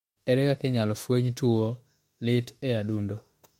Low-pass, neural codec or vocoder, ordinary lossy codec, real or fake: 19.8 kHz; autoencoder, 48 kHz, 32 numbers a frame, DAC-VAE, trained on Japanese speech; MP3, 64 kbps; fake